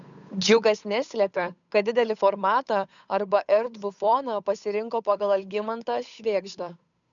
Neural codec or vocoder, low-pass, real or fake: codec, 16 kHz, 8 kbps, FunCodec, trained on Chinese and English, 25 frames a second; 7.2 kHz; fake